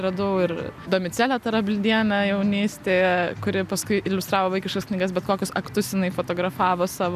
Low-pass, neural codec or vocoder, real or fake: 14.4 kHz; none; real